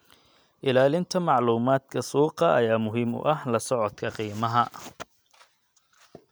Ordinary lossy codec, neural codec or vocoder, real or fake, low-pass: none; none; real; none